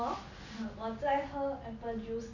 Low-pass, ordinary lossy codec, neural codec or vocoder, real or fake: 7.2 kHz; none; none; real